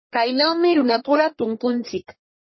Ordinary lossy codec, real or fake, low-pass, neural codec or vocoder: MP3, 24 kbps; fake; 7.2 kHz; codec, 44.1 kHz, 1.7 kbps, Pupu-Codec